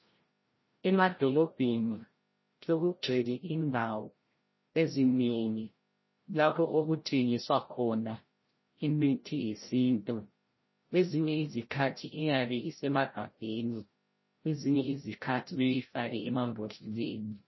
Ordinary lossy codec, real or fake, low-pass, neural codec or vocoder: MP3, 24 kbps; fake; 7.2 kHz; codec, 16 kHz, 0.5 kbps, FreqCodec, larger model